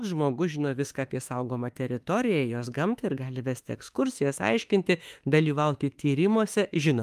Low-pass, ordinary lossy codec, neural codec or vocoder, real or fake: 14.4 kHz; Opus, 32 kbps; autoencoder, 48 kHz, 32 numbers a frame, DAC-VAE, trained on Japanese speech; fake